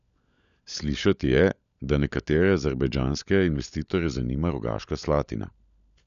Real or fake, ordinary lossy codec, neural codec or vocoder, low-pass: fake; none; codec, 16 kHz, 16 kbps, FunCodec, trained on LibriTTS, 50 frames a second; 7.2 kHz